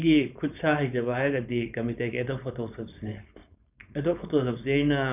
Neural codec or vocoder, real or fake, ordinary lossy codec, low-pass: codec, 16 kHz, 4.8 kbps, FACodec; fake; none; 3.6 kHz